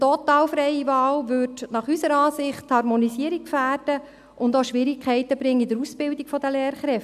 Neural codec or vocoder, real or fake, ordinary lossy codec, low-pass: none; real; none; 14.4 kHz